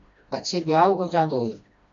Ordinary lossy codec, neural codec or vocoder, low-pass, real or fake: MP3, 48 kbps; codec, 16 kHz, 1 kbps, FreqCodec, smaller model; 7.2 kHz; fake